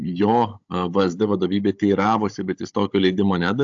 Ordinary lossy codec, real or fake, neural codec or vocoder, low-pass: AAC, 64 kbps; fake; codec, 16 kHz, 16 kbps, FunCodec, trained on Chinese and English, 50 frames a second; 7.2 kHz